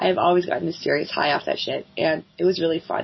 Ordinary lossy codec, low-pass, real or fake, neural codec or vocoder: MP3, 24 kbps; 7.2 kHz; real; none